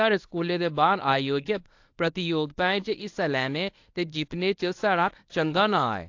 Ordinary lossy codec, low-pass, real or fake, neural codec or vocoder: AAC, 48 kbps; 7.2 kHz; fake; codec, 24 kHz, 0.9 kbps, WavTokenizer, medium speech release version 1